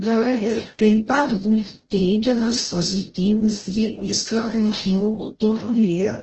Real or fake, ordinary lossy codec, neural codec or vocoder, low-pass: fake; Opus, 16 kbps; codec, 16 kHz, 0.5 kbps, FreqCodec, larger model; 7.2 kHz